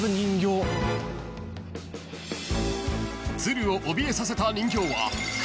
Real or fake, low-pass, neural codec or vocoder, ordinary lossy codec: real; none; none; none